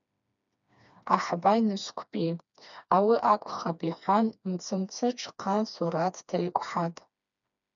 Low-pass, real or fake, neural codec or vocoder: 7.2 kHz; fake; codec, 16 kHz, 2 kbps, FreqCodec, smaller model